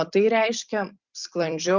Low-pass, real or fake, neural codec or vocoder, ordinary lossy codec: 7.2 kHz; fake; vocoder, 44.1 kHz, 128 mel bands every 256 samples, BigVGAN v2; Opus, 64 kbps